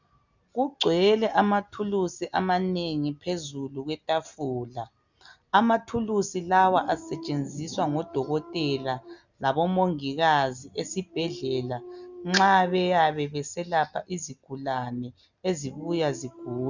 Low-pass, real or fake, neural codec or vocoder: 7.2 kHz; real; none